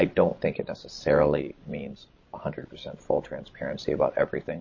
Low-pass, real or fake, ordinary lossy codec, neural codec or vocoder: 7.2 kHz; fake; MP3, 32 kbps; codec, 16 kHz, 16 kbps, FreqCodec, larger model